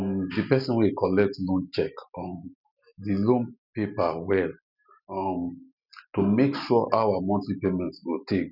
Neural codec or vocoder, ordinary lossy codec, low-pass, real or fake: none; none; 5.4 kHz; real